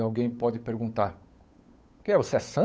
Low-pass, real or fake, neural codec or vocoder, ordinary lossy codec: none; fake; codec, 16 kHz, 8 kbps, FunCodec, trained on Chinese and English, 25 frames a second; none